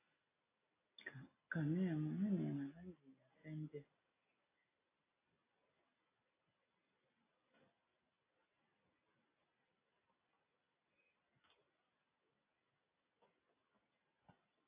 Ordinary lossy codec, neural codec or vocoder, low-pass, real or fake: AAC, 16 kbps; none; 3.6 kHz; real